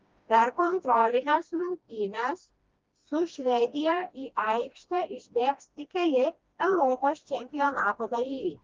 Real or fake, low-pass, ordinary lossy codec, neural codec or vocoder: fake; 7.2 kHz; Opus, 24 kbps; codec, 16 kHz, 1 kbps, FreqCodec, smaller model